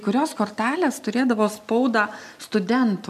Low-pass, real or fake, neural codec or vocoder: 14.4 kHz; real; none